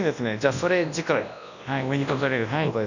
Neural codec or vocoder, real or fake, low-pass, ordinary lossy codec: codec, 24 kHz, 0.9 kbps, WavTokenizer, large speech release; fake; 7.2 kHz; none